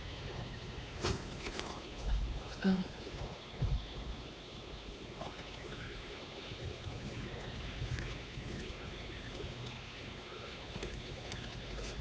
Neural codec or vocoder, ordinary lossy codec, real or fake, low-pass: codec, 16 kHz, 2 kbps, X-Codec, WavLM features, trained on Multilingual LibriSpeech; none; fake; none